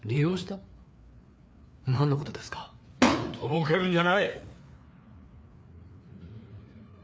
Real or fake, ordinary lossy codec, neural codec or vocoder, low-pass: fake; none; codec, 16 kHz, 4 kbps, FreqCodec, larger model; none